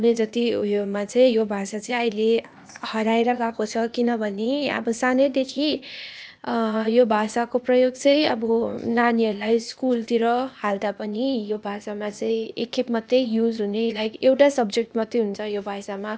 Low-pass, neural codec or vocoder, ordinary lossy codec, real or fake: none; codec, 16 kHz, 0.8 kbps, ZipCodec; none; fake